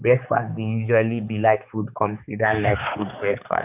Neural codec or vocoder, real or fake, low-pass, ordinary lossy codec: codec, 16 kHz, 4 kbps, X-Codec, HuBERT features, trained on balanced general audio; fake; 3.6 kHz; none